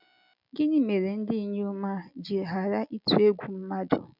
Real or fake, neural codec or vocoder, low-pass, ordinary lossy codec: real; none; 5.4 kHz; none